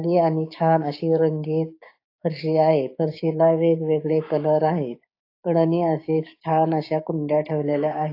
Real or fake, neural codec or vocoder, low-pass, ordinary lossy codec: fake; codec, 16 kHz, 6 kbps, DAC; 5.4 kHz; AAC, 32 kbps